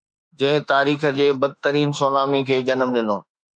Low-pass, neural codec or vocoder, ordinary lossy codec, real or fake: 9.9 kHz; autoencoder, 48 kHz, 32 numbers a frame, DAC-VAE, trained on Japanese speech; MP3, 96 kbps; fake